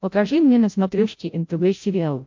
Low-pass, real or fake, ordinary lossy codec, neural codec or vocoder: 7.2 kHz; fake; MP3, 48 kbps; codec, 16 kHz, 0.5 kbps, FreqCodec, larger model